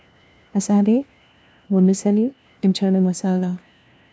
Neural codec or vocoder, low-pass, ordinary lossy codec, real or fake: codec, 16 kHz, 1 kbps, FunCodec, trained on LibriTTS, 50 frames a second; none; none; fake